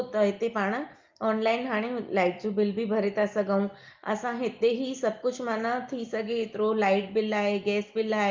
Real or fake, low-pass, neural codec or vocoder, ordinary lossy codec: real; 7.2 kHz; none; Opus, 24 kbps